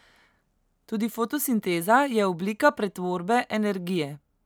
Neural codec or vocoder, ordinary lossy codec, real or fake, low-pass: none; none; real; none